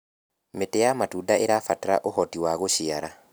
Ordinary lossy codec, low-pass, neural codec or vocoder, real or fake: none; none; none; real